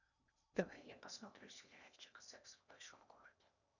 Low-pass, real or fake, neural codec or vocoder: 7.2 kHz; fake; codec, 16 kHz in and 24 kHz out, 0.8 kbps, FocalCodec, streaming, 65536 codes